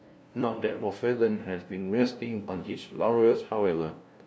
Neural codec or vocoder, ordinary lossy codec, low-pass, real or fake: codec, 16 kHz, 0.5 kbps, FunCodec, trained on LibriTTS, 25 frames a second; none; none; fake